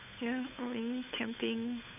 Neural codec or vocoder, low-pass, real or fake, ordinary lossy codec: codec, 16 kHz, 8 kbps, FunCodec, trained on Chinese and English, 25 frames a second; 3.6 kHz; fake; none